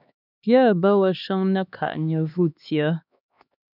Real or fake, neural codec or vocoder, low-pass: fake; codec, 16 kHz, 2 kbps, X-Codec, HuBERT features, trained on LibriSpeech; 5.4 kHz